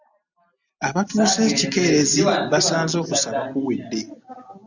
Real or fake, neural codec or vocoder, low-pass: real; none; 7.2 kHz